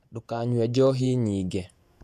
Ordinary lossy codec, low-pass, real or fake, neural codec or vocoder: none; 14.4 kHz; fake; vocoder, 48 kHz, 128 mel bands, Vocos